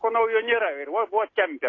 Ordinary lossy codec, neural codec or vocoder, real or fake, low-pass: AAC, 32 kbps; none; real; 7.2 kHz